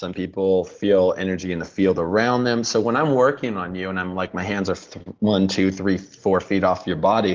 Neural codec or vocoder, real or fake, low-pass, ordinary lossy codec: none; real; 7.2 kHz; Opus, 16 kbps